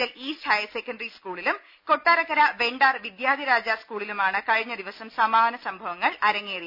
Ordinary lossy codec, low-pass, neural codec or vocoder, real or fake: none; 5.4 kHz; none; real